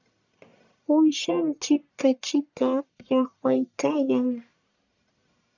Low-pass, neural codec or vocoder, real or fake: 7.2 kHz; codec, 44.1 kHz, 1.7 kbps, Pupu-Codec; fake